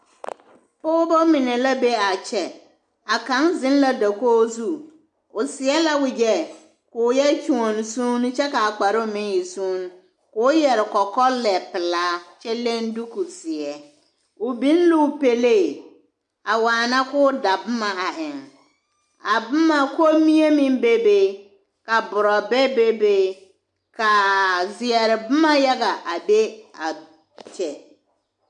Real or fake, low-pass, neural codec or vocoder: real; 10.8 kHz; none